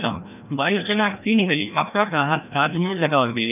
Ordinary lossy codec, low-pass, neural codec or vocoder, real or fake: none; 3.6 kHz; codec, 16 kHz, 1 kbps, FreqCodec, larger model; fake